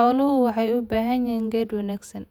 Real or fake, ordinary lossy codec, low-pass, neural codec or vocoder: fake; none; 19.8 kHz; vocoder, 48 kHz, 128 mel bands, Vocos